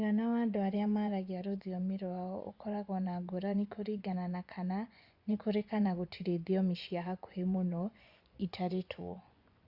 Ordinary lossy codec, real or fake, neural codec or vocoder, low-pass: Opus, 64 kbps; fake; vocoder, 24 kHz, 100 mel bands, Vocos; 5.4 kHz